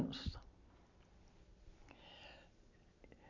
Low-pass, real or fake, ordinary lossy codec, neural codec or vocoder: 7.2 kHz; real; none; none